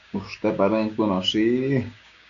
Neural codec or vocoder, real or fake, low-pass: codec, 16 kHz, 6 kbps, DAC; fake; 7.2 kHz